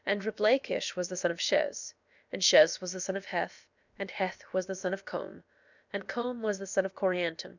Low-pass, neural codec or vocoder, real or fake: 7.2 kHz; codec, 16 kHz, about 1 kbps, DyCAST, with the encoder's durations; fake